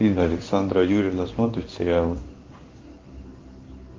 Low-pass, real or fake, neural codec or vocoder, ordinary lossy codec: 7.2 kHz; fake; codec, 16 kHz in and 24 kHz out, 1 kbps, XY-Tokenizer; Opus, 32 kbps